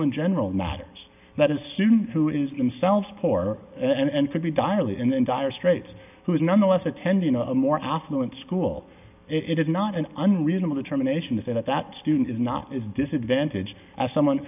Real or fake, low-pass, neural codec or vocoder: real; 3.6 kHz; none